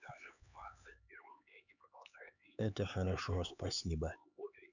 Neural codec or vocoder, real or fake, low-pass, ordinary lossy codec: codec, 16 kHz, 4 kbps, X-Codec, HuBERT features, trained on LibriSpeech; fake; 7.2 kHz; none